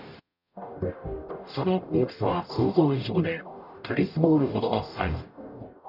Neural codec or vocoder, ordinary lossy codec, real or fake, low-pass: codec, 44.1 kHz, 0.9 kbps, DAC; none; fake; 5.4 kHz